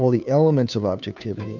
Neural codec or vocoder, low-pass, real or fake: codec, 16 kHz, 2 kbps, FunCodec, trained on Chinese and English, 25 frames a second; 7.2 kHz; fake